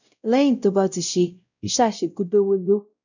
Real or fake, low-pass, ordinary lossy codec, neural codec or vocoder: fake; 7.2 kHz; none; codec, 16 kHz, 0.5 kbps, X-Codec, WavLM features, trained on Multilingual LibriSpeech